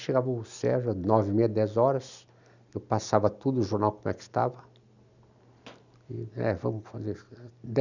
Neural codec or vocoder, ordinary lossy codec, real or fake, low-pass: none; none; real; 7.2 kHz